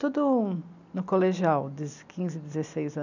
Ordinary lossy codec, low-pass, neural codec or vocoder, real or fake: none; 7.2 kHz; none; real